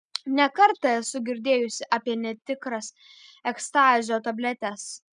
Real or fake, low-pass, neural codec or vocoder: real; 9.9 kHz; none